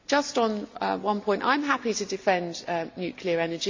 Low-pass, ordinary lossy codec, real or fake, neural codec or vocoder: 7.2 kHz; MP3, 64 kbps; real; none